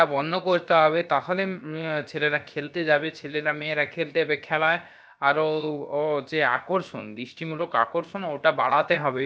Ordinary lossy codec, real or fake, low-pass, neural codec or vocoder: none; fake; none; codec, 16 kHz, about 1 kbps, DyCAST, with the encoder's durations